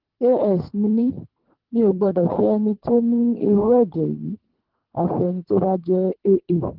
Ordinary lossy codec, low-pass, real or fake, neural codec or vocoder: Opus, 16 kbps; 5.4 kHz; fake; codec, 24 kHz, 3 kbps, HILCodec